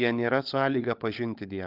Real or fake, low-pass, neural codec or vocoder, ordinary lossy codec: fake; 5.4 kHz; codec, 16 kHz, 16 kbps, FunCodec, trained on LibriTTS, 50 frames a second; Opus, 24 kbps